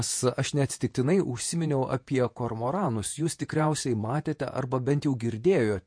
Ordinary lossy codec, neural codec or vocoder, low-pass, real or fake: MP3, 48 kbps; vocoder, 48 kHz, 128 mel bands, Vocos; 9.9 kHz; fake